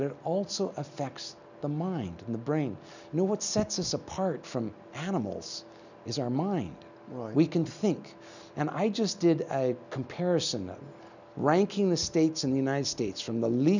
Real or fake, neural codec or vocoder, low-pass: real; none; 7.2 kHz